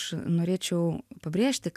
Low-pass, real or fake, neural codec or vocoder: 14.4 kHz; real; none